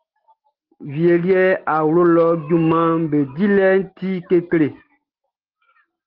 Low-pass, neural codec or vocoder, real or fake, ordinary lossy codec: 5.4 kHz; none; real; Opus, 16 kbps